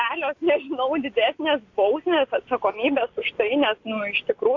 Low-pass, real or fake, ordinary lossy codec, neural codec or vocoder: 7.2 kHz; real; AAC, 48 kbps; none